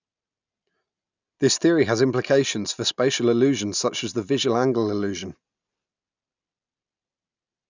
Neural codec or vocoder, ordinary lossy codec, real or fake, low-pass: none; none; real; 7.2 kHz